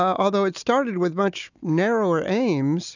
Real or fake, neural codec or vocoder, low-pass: real; none; 7.2 kHz